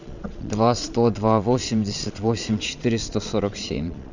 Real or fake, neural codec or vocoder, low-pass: fake; vocoder, 22.05 kHz, 80 mel bands, Vocos; 7.2 kHz